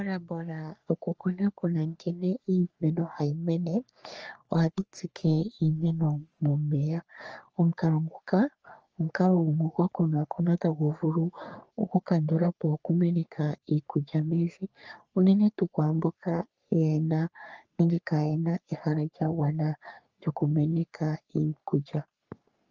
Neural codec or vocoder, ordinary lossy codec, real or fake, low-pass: codec, 44.1 kHz, 3.4 kbps, Pupu-Codec; Opus, 24 kbps; fake; 7.2 kHz